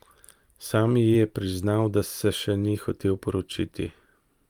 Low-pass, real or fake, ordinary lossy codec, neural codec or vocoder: 19.8 kHz; fake; Opus, 32 kbps; vocoder, 44.1 kHz, 128 mel bands every 512 samples, BigVGAN v2